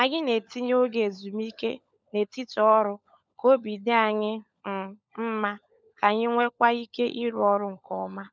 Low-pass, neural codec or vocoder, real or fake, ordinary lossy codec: none; codec, 16 kHz, 16 kbps, FunCodec, trained on LibriTTS, 50 frames a second; fake; none